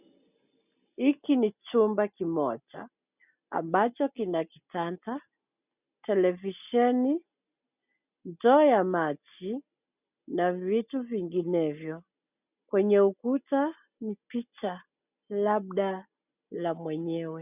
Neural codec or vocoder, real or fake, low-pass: none; real; 3.6 kHz